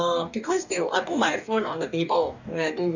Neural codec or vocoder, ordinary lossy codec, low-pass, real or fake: codec, 44.1 kHz, 2.6 kbps, DAC; none; 7.2 kHz; fake